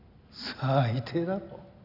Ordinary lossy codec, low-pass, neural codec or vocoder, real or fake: none; 5.4 kHz; none; real